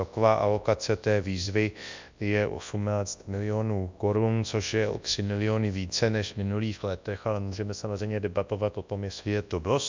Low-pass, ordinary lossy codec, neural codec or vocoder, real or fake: 7.2 kHz; MP3, 64 kbps; codec, 24 kHz, 0.9 kbps, WavTokenizer, large speech release; fake